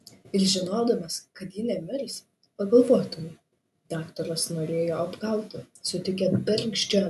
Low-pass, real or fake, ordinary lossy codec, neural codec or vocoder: 14.4 kHz; real; AAC, 96 kbps; none